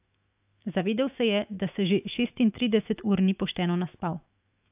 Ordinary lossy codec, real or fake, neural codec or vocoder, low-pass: AAC, 32 kbps; fake; autoencoder, 48 kHz, 128 numbers a frame, DAC-VAE, trained on Japanese speech; 3.6 kHz